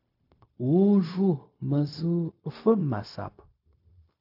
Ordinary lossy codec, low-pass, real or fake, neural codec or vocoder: AAC, 48 kbps; 5.4 kHz; fake; codec, 16 kHz, 0.4 kbps, LongCat-Audio-Codec